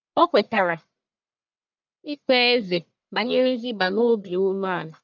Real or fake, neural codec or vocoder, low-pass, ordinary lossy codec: fake; codec, 44.1 kHz, 1.7 kbps, Pupu-Codec; 7.2 kHz; none